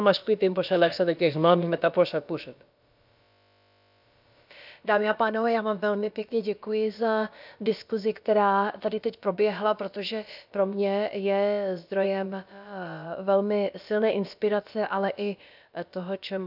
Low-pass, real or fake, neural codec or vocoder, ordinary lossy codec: 5.4 kHz; fake; codec, 16 kHz, about 1 kbps, DyCAST, with the encoder's durations; none